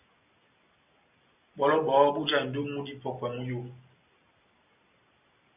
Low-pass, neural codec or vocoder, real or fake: 3.6 kHz; none; real